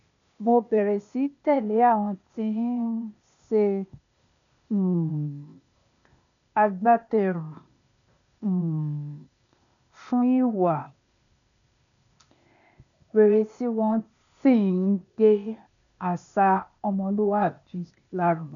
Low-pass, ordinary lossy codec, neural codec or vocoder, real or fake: 7.2 kHz; none; codec, 16 kHz, 0.8 kbps, ZipCodec; fake